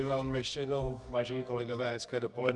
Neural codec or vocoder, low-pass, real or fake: codec, 24 kHz, 0.9 kbps, WavTokenizer, medium music audio release; 10.8 kHz; fake